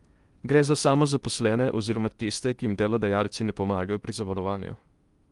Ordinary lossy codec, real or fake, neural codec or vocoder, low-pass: Opus, 32 kbps; fake; codec, 16 kHz in and 24 kHz out, 0.8 kbps, FocalCodec, streaming, 65536 codes; 10.8 kHz